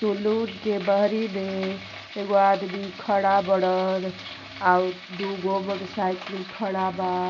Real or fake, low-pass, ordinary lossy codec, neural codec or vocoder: real; 7.2 kHz; none; none